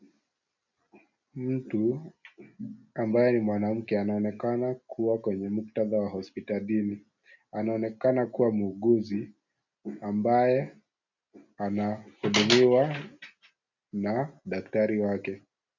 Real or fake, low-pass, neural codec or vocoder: real; 7.2 kHz; none